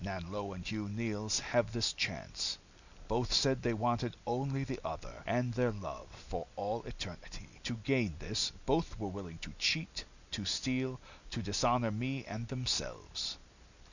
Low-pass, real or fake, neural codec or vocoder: 7.2 kHz; real; none